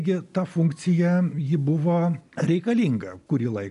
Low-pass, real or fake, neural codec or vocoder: 10.8 kHz; real; none